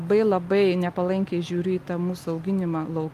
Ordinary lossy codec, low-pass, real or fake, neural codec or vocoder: Opus, 32 kbps; 14.4 kHz; real; none